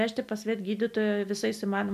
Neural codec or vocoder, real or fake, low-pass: none; real; 14.4 kHz